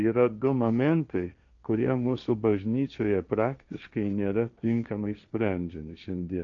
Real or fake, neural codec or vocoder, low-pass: fake; codec, 16 kHz, 1.1 kbps, Voila-Tokenizer; 7.2 kHz